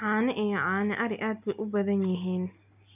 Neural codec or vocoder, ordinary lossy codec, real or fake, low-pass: none; none; real; 3.6 kHz